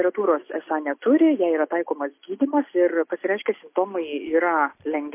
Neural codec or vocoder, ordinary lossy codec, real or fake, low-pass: none; MP3, 24 kbps; real; 3.6 kHz